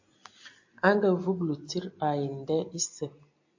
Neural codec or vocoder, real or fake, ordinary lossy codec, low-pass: none; real; AAC, 48 kbps; 7.2 kHz